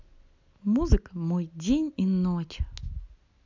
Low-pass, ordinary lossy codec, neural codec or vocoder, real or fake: 7.2 kHz; none; none; real